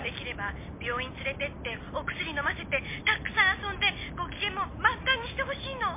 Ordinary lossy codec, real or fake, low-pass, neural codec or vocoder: MP3, 24 kbps; real; 3.6 kHz; none